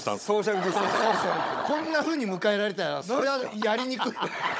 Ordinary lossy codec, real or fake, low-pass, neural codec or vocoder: none; fake; none; codec, 16 kHz, 16 kbps, FunCodec, trained on Chinese and English, 50 frames a second